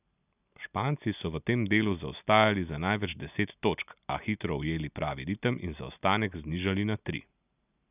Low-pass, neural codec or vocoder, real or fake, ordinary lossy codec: 3.6 kHz; none; real; none